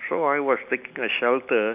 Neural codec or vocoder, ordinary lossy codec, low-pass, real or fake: none; none; 3.6 kHz; real